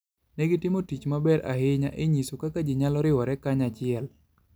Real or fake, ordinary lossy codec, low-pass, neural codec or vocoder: real; none; none; none